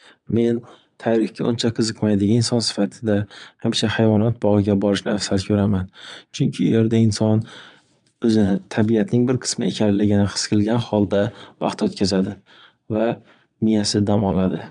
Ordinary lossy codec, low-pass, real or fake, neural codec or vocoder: none; 9.9 kHz; fake; vocoder, 22.05 kHz, 80 mel bands, Vocos